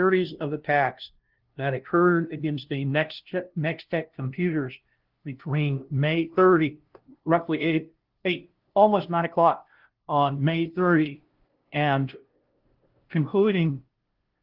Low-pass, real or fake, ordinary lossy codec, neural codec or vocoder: 5.4 kHz; fake; Opus, 16 kbps; codec, 16 kHz, 0.5 kbps, FunCodec, trained on LibriTTS, 25 frames a second